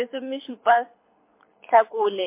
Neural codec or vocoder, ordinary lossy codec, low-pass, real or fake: codec, 24 kHz, 6 kbps, HILCodec; MP3, 24 kbps; 3.6 kHz; fake